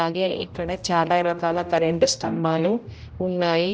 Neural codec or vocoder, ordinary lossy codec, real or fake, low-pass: codec, 16 kHz, 0.5 kbps, X-Codec, HuBERT features, trained on general audio; none; fake; none